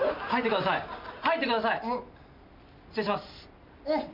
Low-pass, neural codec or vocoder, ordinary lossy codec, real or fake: 5.4 kHz; none; MP3, 48 kbps; real